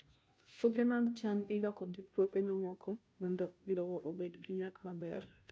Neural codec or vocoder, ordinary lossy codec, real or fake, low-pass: codec, 16 kHz, 0.5 kbps, FunCodec, trained on Chinese and English, 25 frames a second; none; fake; none